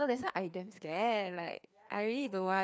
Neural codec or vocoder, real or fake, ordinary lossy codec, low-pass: codec, 16 kHz, 2 kbps, FreqCodec, larger model; fake; none; none